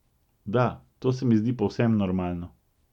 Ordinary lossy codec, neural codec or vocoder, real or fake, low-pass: none; none; real; 19.8 kHz